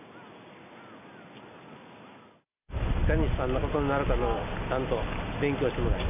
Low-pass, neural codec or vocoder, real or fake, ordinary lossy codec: 3.6 kHz; none; real; none